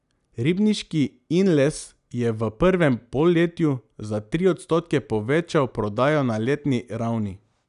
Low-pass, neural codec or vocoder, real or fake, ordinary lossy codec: 10.8 kHz; none; real; none